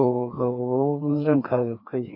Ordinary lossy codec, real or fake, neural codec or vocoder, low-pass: none; fake; codec, 16 kHz, 2 kbps, FreqCodec, larger model; 5.4 kHz